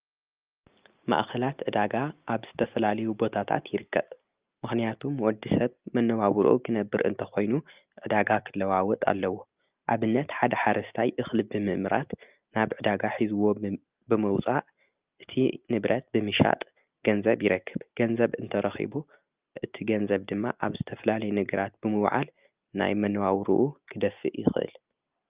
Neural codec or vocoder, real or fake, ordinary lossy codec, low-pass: none; real; Opus, 24 kbps; 3.6 kHz